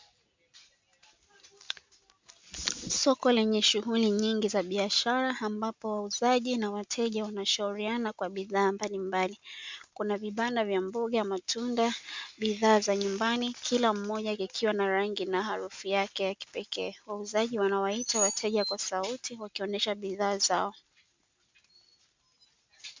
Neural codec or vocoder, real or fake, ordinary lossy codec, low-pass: none; real; MP3, 64 kbps; 7.2 kHz